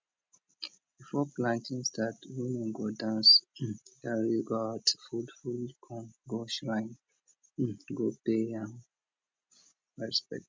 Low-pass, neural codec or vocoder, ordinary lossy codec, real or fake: none; none; none; real